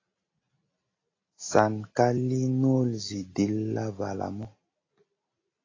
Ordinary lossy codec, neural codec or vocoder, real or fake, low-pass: AAC, 32 kbps; none; real; 7.2 kHz